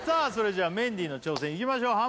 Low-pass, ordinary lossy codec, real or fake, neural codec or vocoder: none; none; real; none